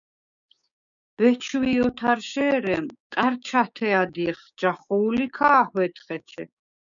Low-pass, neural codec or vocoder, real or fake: 7.2 kHz; codec, 16 kHz, 6 kbps, DAC; fake